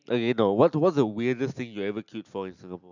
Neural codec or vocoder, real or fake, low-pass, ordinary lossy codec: none; real; 7.2 kHz; none